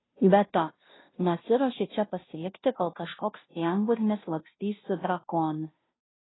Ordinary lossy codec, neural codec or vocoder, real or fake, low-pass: AAC, 16 kbps; codec, 16 kHz, 0.5 kbps, FunCodec, trained on Chinese and English, 25 frames a second; fake; 7.2 kHz